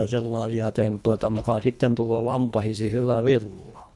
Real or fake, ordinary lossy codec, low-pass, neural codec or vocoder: fake; none; none; codec, 24 kHz, 1.5 kbps, HILCodec